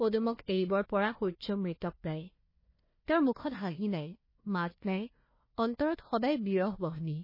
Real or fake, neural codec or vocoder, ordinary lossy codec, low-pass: fake; codec, 16 kHz, 1 kbps, FunCodec, trained on Chinese and English, 50 frames a second; MP3, 24 kbps; 5.4 kHz